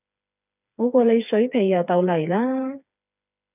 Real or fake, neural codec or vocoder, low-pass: fake; codec, 16 kHz, 4 kbps, FreqCodec, smaller model; 3.6 kHz